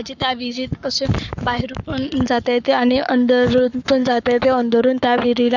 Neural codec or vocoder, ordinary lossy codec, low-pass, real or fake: codec, 44.1 kHz, 7.8 kbps, Pupu-Codec; none; 7.2 kHz; fake